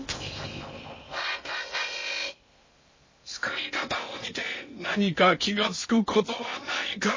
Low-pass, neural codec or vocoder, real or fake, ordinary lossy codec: 7.2 kHz; codec, 16 kHz in and 24 kHz out, 0.6 kbps, FocalCodec, streaming, 4096 codes; fake; MP3, 32 kbps